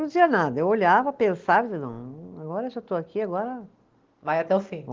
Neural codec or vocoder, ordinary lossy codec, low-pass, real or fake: none; Opus, 16 kbps; 7.2 kHz; real